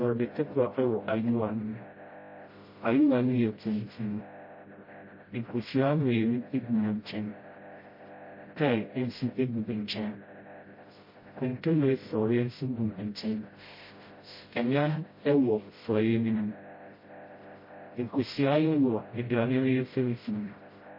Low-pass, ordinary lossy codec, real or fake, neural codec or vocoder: 5.4 kHz; MP3, 24 kbps; fake; codec, 16 kHz, 0.5 kbps, FreqCodec, smaller model